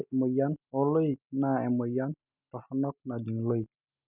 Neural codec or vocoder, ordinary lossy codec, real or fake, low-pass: none; none; real; 3.6 kHz